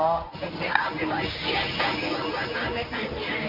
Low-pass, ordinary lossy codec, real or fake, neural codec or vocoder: 5.4 kHz; MP3, 48 kbps; fake; codec, 24 kHz, 0.9 kbps, WavTokenizer, medium speech release version 1